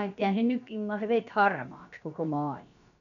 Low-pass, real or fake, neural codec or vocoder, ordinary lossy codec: 7.2 kHz; fake; codec, 16 kHz, about 1 kbps, DyCAST, with the encoder's durations; none